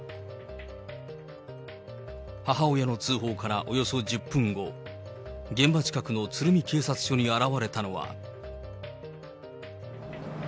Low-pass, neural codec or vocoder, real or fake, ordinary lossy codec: none; none; real; none